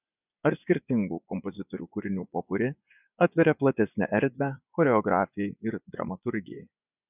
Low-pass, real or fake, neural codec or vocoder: 3.6 kHz; fake; vocoder, 24 kHz, 100 mel bands, Vocos